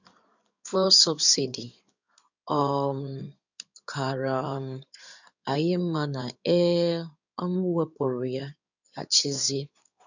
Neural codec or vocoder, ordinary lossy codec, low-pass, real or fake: codec, 16 kHz in and 24 kHz out, 2.2 kbps, FireRedTTS-2 codec; none; 7.2 kHz; fake